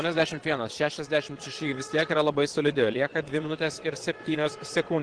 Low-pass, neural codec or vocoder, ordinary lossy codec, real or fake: 9.9 kHz; vocoder, 22.05 kHz, 80 mel bands, WaveNeXt; Opus, 16 kbps; fake